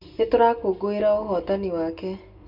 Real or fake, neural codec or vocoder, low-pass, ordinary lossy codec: real; none; 5.4 kHz; Opus, 64 kbps